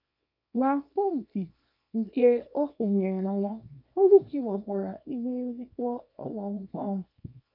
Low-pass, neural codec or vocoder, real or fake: 5.4 kHz; codec, 24 kHz, 0.9 kbps, WavTokenizer, small release; fake